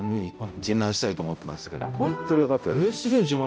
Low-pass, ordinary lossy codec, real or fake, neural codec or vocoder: none; none; fake; codec, 16 kHz, 0.5 kbps, X-Codec, HuBERT features, trained on balanced general audio